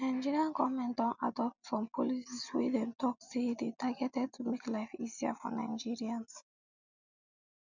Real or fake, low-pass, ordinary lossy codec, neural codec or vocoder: real; 7.2 kHz; none; none